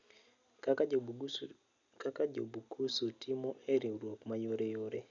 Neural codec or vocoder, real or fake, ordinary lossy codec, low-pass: none; real; none; 7.2 kHz